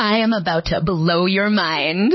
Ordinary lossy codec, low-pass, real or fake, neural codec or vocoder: MP3, 24 kbps; 7.2 kHz; real; none